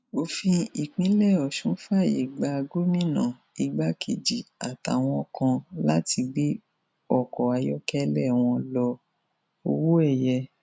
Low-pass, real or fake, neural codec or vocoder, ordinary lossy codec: none; real; none; none